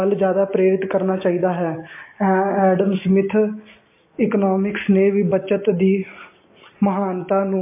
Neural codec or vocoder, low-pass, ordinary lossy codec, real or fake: none; 3.6 kHz; MP3, 24 kbps; real